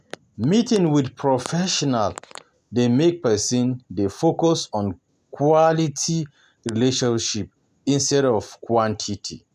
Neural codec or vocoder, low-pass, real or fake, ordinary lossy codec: none; 14.4 kHz; real; none